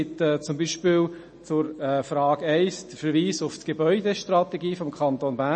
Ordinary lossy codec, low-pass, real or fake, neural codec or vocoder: MP3, 32 kbps; 9.9 kHz; real; none